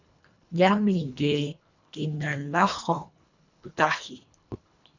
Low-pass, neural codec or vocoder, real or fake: 7.2 kHz; codec, 24 kHz, 1.5 kbps, HILCodec; fake